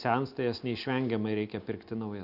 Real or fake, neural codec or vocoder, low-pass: real; none; 5.4 kHz